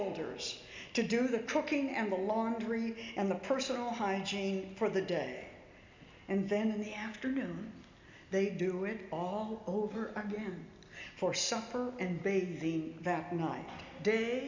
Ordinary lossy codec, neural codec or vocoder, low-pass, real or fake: MP3, 64 kbps; none; 7.2 kHz; real